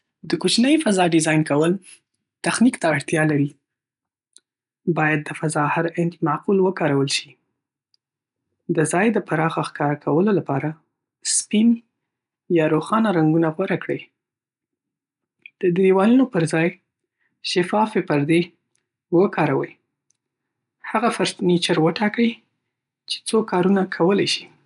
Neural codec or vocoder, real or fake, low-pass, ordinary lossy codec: none; real; 10.8 kHz; none